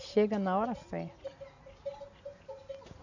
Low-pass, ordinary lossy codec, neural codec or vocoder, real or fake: 7.2 kHz; none; none; real